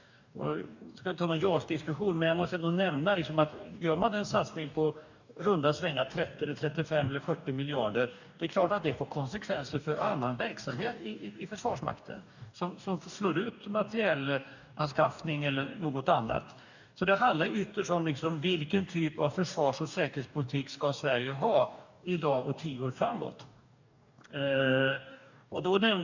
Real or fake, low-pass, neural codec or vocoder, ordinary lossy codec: fake; 7.2 kHz; codec, 44.1 kHz, 2.6 kbps, DAC; none